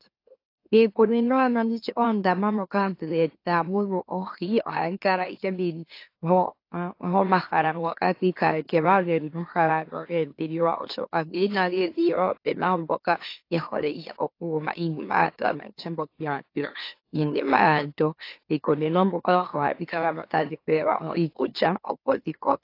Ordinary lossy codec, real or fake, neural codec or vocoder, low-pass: AAC, 32 kbps; fake; autoencoder, 44.1 kHz, a latent of 192 numbers a frame, MeloTTS; 5.4 kHz